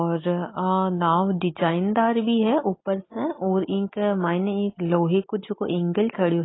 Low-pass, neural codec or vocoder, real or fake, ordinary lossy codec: 7.2 kHz; none; real; AAC, 16 kbps